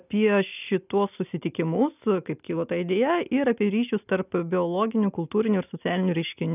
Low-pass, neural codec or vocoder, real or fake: 3.6 kHz; none; real